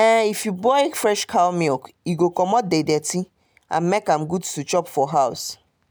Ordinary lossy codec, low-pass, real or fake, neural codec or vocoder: none; none; real; none